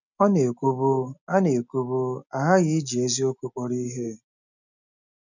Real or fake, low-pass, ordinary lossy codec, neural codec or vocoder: real; 7.2 kHz; none; none